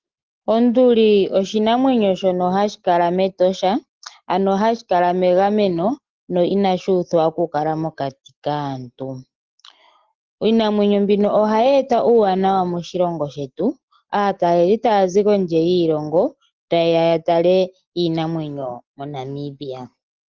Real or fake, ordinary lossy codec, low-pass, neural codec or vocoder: real; Opus, 16 kbps; 7.2 kHz; none